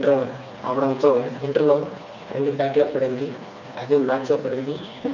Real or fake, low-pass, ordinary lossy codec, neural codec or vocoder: fake; 7.2 kHz; none; codec, 16 kHz, 2 kbps, FreqCodec, smaller model